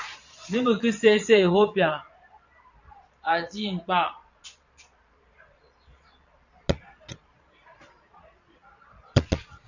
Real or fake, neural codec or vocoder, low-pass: fake; vocoder, 22.05 kHz, 80 mel bands, Vocos; 7.2 kHz